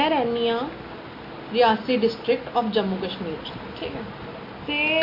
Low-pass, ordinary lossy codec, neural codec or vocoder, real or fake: 5.4 kHz; MP3, 32 kbps; none; real